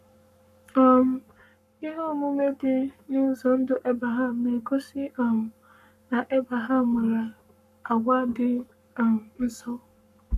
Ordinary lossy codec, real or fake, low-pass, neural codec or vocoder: none; fake; 14.4 kHz; codec, 44.1 kHz, 7.8 kbps, Pupu-Codec